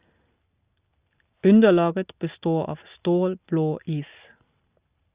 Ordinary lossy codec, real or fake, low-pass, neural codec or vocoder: Opus, 64 kbps; real; 3.6 kHz; none